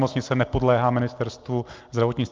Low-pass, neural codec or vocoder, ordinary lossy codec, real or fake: 7.2 kHz; none; Opus, 24 kbps; real